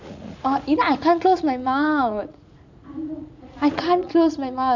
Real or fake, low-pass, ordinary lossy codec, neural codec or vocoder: fake; 7.2 kHz; none; vocoder, 44.1 kHz, 80 mel bands, Vocos